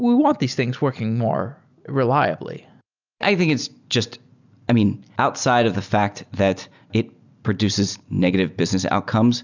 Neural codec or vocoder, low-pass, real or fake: none; 7.2 kHz; real